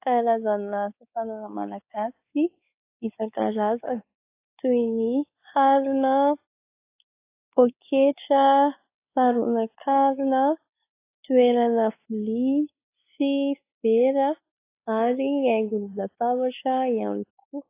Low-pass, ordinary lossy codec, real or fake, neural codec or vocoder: 3.6 kHz; AAC, 24 kbps; fake; codec, 16 kHz, 4 kbps, X-Codec, WavLM features, trained on Multilingual LibriSpeech